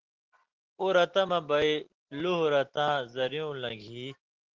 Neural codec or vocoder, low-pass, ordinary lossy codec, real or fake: none; 7.2 kHz; Opus, 16 kbps; real